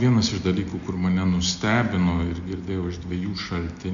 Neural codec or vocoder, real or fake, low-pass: none; real; 7.2 kHz